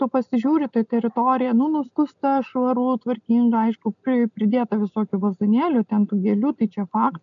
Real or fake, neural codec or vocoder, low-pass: real; none; 7.2 kHz